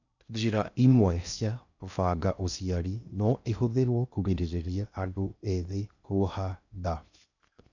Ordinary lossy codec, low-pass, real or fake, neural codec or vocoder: none; 7.2 kHz; fake; codec, 16 kHz in and 24 kHz out, 0.6 kbps, FocalCodec, streaming, 2048 codes